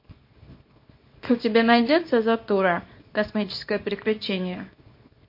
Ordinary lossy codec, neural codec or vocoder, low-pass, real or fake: MP3, 32 kbps; codec, 24 kHz, 0.9 kbps, WavTokenizer, small release; 5.4 kHz; fake